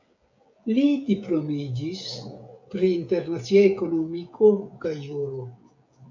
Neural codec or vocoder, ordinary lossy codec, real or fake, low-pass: codec, 16 kHz, 16 kbps, FreqCodec, smaller model; MP3, 64 kbps; fake; 7.2 kHz